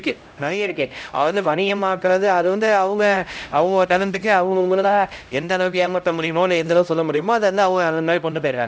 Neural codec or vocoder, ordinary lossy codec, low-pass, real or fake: codec, 16 kHz, 0.5 kbps, X-Codec, HuBERT features, trained on LibriSpeech; none; none; fake